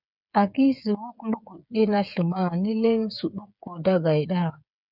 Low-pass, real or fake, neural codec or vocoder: 5.4 kHz; fake; codec, 16 kHz, 8 kbps, FreqCodec, smaller model